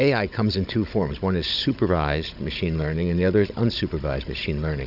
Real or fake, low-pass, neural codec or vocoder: fake; 5.4 kHz; codec, 16 kHz, 16 kbps, FunCodec, trained on Chinese and English, 50 frames a second